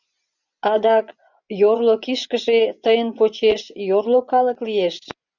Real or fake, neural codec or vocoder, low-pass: fake; vocoder, 24 kHz, 100 mel bands, Vocos; 7.2 kHz